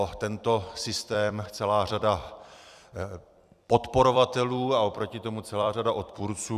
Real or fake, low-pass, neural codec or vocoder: fake; 14.4 kHz; vocoder, 44.1 kHz, 128 mel bands every 256 samples, BigVGAN v2